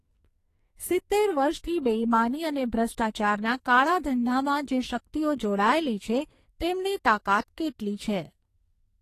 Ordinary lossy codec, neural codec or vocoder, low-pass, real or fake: AAC, 48 kbps; codec, 44.1 kHz, 2.6 kbps, SNAC; 14.4 kHz; fake